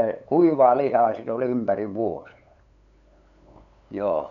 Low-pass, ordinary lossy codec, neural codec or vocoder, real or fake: 7.2 kHz; none; codec, 16 kHz, 8 kbps, FunCodec, trained on LibriTTS, 25 frames a second; fake